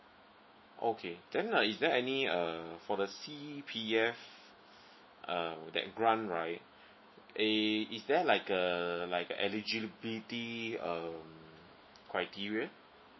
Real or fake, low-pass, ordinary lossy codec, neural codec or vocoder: fake; 7.2 kHz; MP3, 24 kbps; autoencoder, 48 kHz, 128 numbers a frame, DAC-VAE, trained on Japanese speech